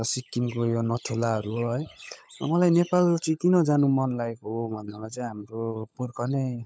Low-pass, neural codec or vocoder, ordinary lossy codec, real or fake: none; codec, 16 kHz, 16 kbps, FunCodec, trained on LibriTTS, 50 frames a second; none; fake